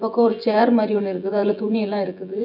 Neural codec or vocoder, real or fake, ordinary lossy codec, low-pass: vocoder, 24 kHz, 100 mel bands, Vocos; fake; none; 5.4 kHz